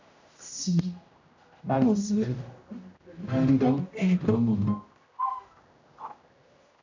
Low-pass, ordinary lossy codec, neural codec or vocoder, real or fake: 7.2 kHz; MP3, 64 kbps; codec, 16 kHz, 0.5 kbps, X-Codec, HuBERT features, trained on general audio; fake